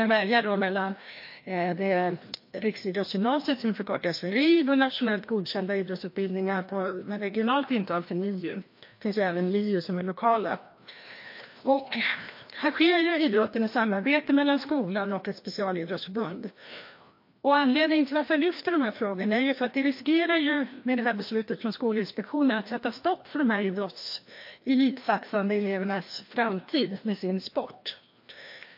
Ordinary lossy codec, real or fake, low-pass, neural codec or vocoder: MP3, 32 kbps; fake; 5.4 kHz; codec, 16 kHz, 1 kbps, FreqCodec, larger model